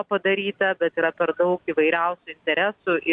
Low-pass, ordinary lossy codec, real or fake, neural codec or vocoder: 9.9 kHz; MP3, 96 kbps; real; none